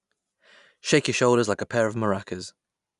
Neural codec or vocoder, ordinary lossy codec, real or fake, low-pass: none; none; real; 10.8 kHz